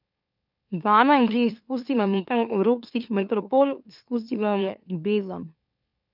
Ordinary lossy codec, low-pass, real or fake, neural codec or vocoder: none; 5.4 kHz; fake; autoencoder, 44.1 kHz, a latent of 192 numbers a frame, MeloTTS